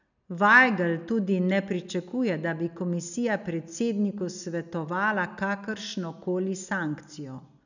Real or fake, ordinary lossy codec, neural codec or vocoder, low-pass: real; none; none; 7.2 kHz